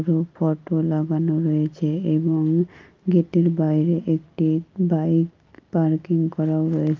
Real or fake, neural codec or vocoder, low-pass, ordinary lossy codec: real; none; 7.2 kHz; Opus, 32 kbps